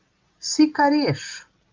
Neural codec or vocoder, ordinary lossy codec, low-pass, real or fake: none; Opus, 32 kbps; 7.2 kHz; real